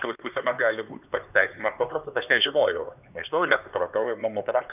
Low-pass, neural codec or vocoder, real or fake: 3.6 kHz; codec, 16 kHz, 2 kbps, X-Codec, HuBERT features, trained on LibriSpeech; fake